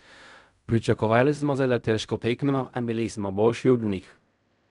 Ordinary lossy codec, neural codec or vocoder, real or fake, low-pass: none; codec, 16 kHz in and 24 kHz out, 0.4 kbps, LongCat-Audio-Codec, fine tuned four codebook decoder; fake; 10.8 kHz